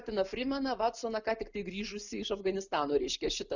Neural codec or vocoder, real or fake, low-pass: none; real; 7.2 kHz